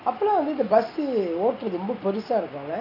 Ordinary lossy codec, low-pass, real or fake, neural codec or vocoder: none; 5.4 kHz; real; none